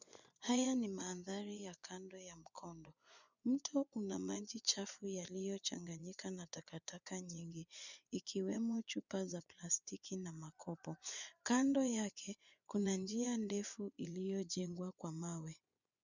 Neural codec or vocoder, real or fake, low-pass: vocoder, 24 kHz, 100 mel bands, Vocos; fake; 7.2 kHz